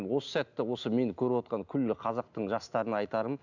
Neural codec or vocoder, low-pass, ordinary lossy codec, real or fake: none; 7.2 kHz; none; real